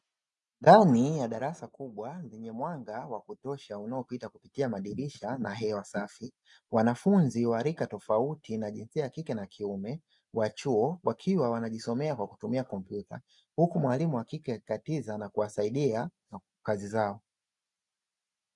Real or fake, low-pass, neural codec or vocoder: real; 10.8 kHz; none